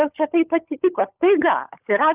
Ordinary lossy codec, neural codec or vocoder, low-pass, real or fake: Opus, 32 kbps; codec, 16 kHz, 16 kbps, FunCodec, trained on LibriTTS, 50 frames a second; 3.6 kHz; fake